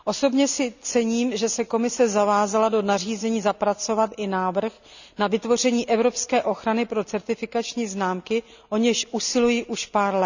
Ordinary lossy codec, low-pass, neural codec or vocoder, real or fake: none; 7.2 kHz; none; real